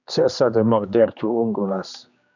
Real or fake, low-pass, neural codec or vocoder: fake; 7.2 kHz; codec, 16 kHz, 2 kbps, X-Codec, HuBERT features, trained on general audio